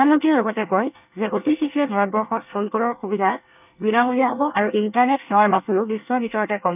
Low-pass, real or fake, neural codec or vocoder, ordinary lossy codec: 3.6 kHz; fake; codec, 24 kHz, 1 kbps, SNAC; none